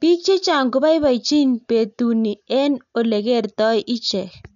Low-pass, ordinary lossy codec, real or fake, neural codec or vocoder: 7.2 kHz; none; real; none